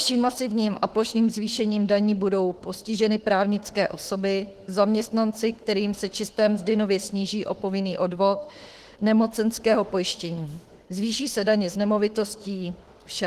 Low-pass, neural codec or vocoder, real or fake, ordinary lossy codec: 14.4 kHz; autoencoder, 48 kHz, 32 numbers a frame, DAC-VAE, trained on Japanese speech; fake; Opus, 16 kbps